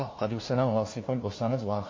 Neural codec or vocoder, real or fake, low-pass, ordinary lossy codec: codec, 16 kHz, 1 kbps, FunCodec, trained on LibriTTS, 50 frames a second; fake; 7.2 kHz; MP3, 32 kbps